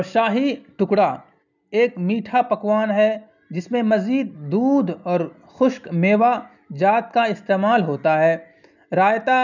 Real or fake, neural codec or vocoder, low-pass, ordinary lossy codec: real; none; 7.2 kHz; none